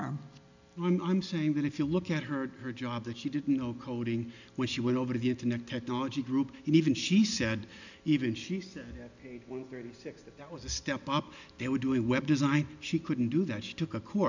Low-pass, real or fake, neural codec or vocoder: 7.2 kHz; real; none